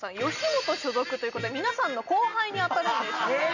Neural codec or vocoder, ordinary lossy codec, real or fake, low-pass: none; none; real; 7.2 kHz